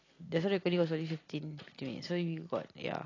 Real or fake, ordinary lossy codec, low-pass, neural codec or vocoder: real; AAC, 32 kbps; 7.2 kHz; none